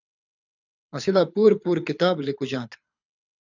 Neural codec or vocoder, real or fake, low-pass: codec, 24 kHz, 6 kbps, HILCodec; fake; 7.2 kHz